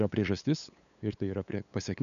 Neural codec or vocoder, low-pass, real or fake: codec, 16 kHz, 8 kbps, FunCodec, trained on LibriTTS, 25 frames a second; 7.2 kHz; fake